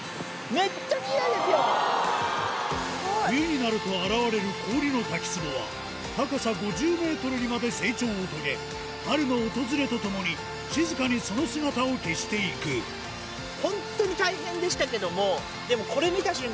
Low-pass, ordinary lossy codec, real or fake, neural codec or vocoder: none; none; real; none